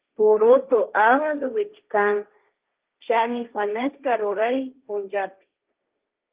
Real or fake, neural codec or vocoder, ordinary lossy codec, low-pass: fake; codec, 16 kHz, 1.1 kbps, Voila-Tokenizer; Opus, 32 kbps; 3.6 kHz